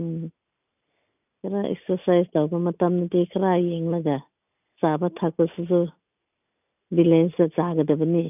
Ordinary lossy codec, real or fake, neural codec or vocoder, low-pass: none; real; none; 3.6 kHz